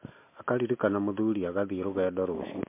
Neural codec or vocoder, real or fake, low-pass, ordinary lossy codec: none; real; 3.6 kHz; MP3, 24 kbps